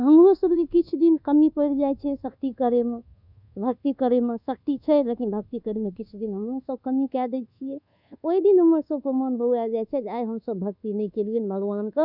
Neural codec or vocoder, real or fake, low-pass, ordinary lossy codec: codec, 24 kHz, 1.2 kbps, DualCodec; fake; 5.4 kHz; none